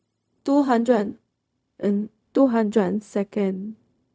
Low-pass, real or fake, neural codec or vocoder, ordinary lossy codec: none; fake; codec, 16 kHz, 0.4 kbps, LongCat-Audio-Codec; none